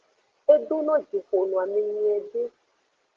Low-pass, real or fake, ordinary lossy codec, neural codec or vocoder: 7.2 kHz; real; Opus, 16 kbps; none